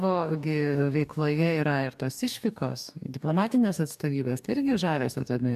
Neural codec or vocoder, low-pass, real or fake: codec, 44.1 kHz, 2.6 kbps, DAC; 14.4 kHz; fake